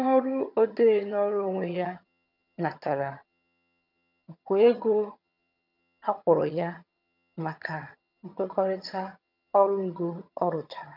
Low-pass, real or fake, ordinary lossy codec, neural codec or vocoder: 5.4 kHz; fake; none; vocoder, 22.05 kHz, 80 mel bands, HiFi-GAN